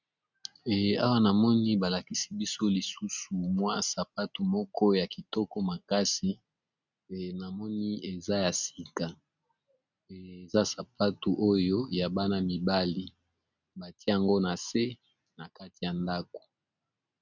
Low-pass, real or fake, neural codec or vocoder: 7.2 kHz; real; none